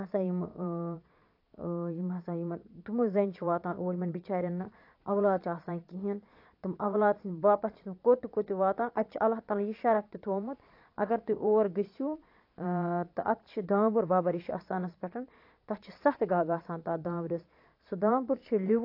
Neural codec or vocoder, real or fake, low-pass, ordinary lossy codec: vocoder, 22.05 kHz, 80 mel bands, Vocos; fake; 5.4 kHz; AAC, 32 kbps